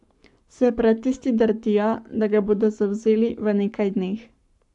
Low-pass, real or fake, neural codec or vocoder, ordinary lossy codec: 10.8 kHz; fake; codec, 44.1 kHz, 7.8 kbps, Pupu-Codec; none